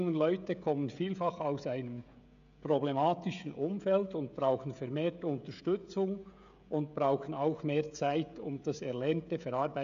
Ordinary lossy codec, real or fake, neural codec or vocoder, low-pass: none; fake; codec, 16 kHz, 16 kbps, FreqCodec, smaller model; 7.2 kHz